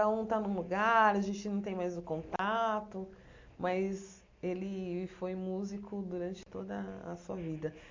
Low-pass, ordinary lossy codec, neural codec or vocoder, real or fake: 7.2 kHz; MP3, 48 kbps; none; real